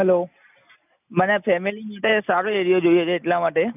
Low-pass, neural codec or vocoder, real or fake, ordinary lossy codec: 3.6 kHz; none; real; none